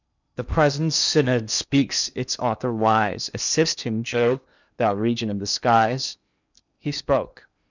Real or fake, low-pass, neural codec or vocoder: fake; 7.2 kHz; codec, 16 kHz in and 24 kHz out, 0.8 kbps, FocalCodec, streaming, 65536 codes